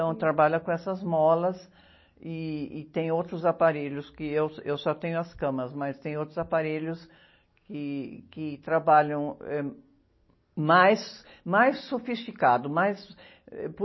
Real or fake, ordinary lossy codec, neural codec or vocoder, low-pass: real; MP3, 24 kbps; none; 7.2 kHz